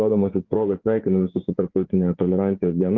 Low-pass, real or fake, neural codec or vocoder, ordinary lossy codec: 7.2 kHz; fake; vocoder, 24 kHz, 100 mel bands, Vocos; Opus, 24 kbps